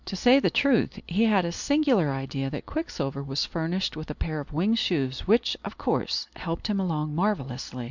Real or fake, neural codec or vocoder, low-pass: real; none; 7.2 kHz